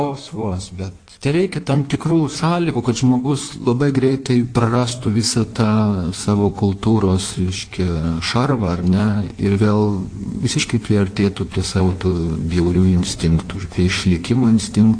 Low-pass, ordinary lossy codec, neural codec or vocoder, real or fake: 9.9 kHz; AAC, 48 kbps; codec, 16 kHz in and 24 kHz out, 1.1 kbps, FireRedTTS-2 codec; fake